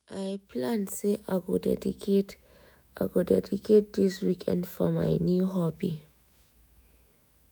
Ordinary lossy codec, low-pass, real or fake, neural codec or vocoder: none; none; fake; autoencoder, 48 kHz, 128 numbers a frame, DAC-VAE, trained on Japanese speech